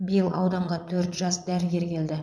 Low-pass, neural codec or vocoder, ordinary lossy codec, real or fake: none; vocoder, 22.05 kHz, 80 mel bands, Vocos; none; fake